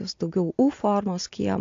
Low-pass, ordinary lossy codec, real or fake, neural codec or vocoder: 7.2 kHz; AAC, 48 kbps; real; none